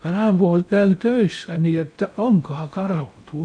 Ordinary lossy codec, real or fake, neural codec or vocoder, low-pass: none; fake; codec, 16 kHz in and 24 kHz out, 0.6 kbps, FocalCodec, streaming, 4096 codes; 9.9 kHz